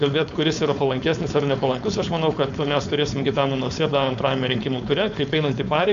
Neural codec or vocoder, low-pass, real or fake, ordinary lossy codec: codec, 16 kHz, 4.8 kbps, FACodec; 7.2 kHz; fake; MP3, 64 kbps